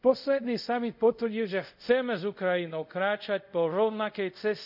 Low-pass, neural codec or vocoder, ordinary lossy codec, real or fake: 5.4 kHz; codec, 24 kHz, 0.5 kbps, DualCodec; none; fake